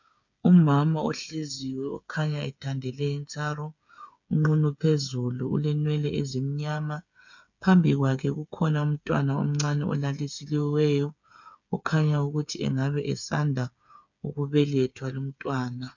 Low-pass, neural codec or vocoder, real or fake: 7.2 kHz; codec, 16 kHz, 8 kbps, FreqCodec, smaller model; fake